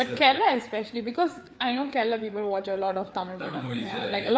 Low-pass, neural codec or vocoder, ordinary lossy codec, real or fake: none; codec, 16 kHz, 4 kbps, FreqCodec, larger model; none; fake